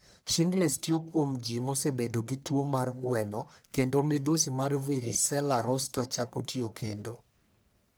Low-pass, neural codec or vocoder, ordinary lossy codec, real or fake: none; codec, 44.1 kHz, 1.7 kbps, Pupu-Codec; none; fake